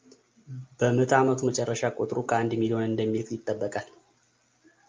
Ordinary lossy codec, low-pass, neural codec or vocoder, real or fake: Opus, 24 kbps; 7.2 kHz; none; real